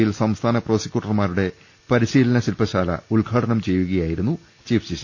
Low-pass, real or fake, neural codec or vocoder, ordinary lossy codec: none; real; none; none